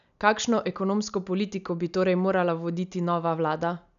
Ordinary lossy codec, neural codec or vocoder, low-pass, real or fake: none; none; 7.2 kHz; real